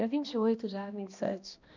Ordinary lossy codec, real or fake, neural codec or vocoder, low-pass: none; fake; codec, 16 kHz, 1 kbps, X-Codec, HuBERT features, trained on balanced general audio; 7.2 kHz